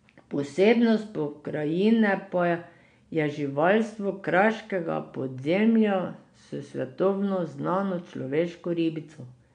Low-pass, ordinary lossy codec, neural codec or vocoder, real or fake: 9.9 kHz; MP3, 64 kbps; none; real